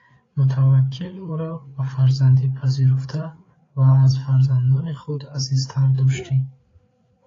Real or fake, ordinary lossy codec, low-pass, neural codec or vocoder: fake; AAC, 32 kbps; 7.2 kHz; codec, 16 kHz, 4 kbps, FreqCodec, larger model